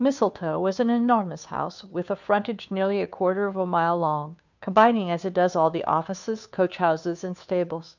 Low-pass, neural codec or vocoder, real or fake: 7.2 kHz; codec, 16 kHz, 2 kbps, FunCodec, trained on Chinese and English, 25 frames a second; fake